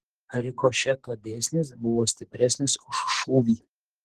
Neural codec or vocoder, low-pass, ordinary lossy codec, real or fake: codec, 44.1 kHz, 2.6 kbps, SNAC; 14.4 kHz; Opus, 16 kbps; fake